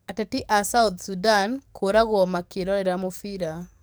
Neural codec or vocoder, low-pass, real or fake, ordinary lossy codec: codec, 44.1 kHz, 7.8 kbps, DAC; none; fake; none